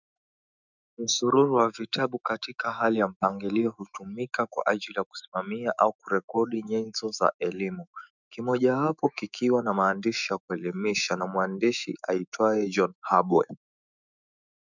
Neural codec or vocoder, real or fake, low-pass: autoencoder, 48 kHz, 128 numbers a frame, DAC-VAE, trained on Japanese speech; fake; 7.2 kHz